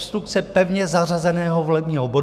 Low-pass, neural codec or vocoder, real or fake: 14.4 kHz; autoencoder, 48 kHz, 128 numbers a frame, DAC-VAE, trained on Japanese speech; fake